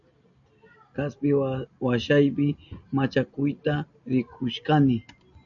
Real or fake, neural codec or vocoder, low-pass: real; none; 7.2 kHz